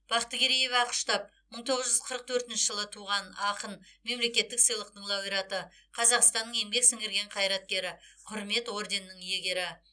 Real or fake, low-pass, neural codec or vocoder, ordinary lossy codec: real; 9.9 kHz; none; none